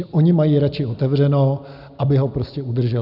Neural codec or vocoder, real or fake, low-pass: none; real; 5.4 kHz